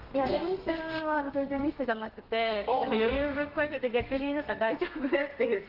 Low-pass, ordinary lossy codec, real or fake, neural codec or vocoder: 5.4 kHz; Opus, 32 kbps; fake; codec, 32 kHz, 1.9 kbps, SNAC